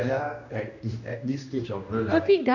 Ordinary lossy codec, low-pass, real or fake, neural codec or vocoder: none; 7.2 kHz; fake; codec, 16 kHz, 1 kbps, X-Codec, HuBERT features, trained on general audio